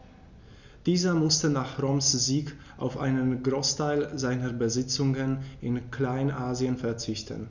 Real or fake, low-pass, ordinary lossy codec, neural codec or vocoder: real; 7.2 kHz; none; none